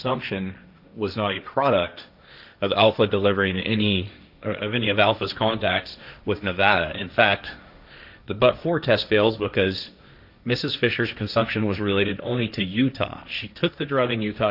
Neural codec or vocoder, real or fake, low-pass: codec, 16 kHz, 1.1 kbps, Voila-Tokenizer; fake; 5.4 kHz